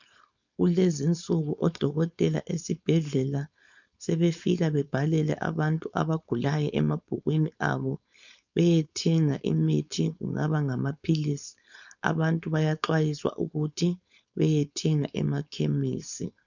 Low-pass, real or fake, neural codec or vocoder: 7.2 kHz; fake; codec, 16 kHz, 4.8 kbps, FACodec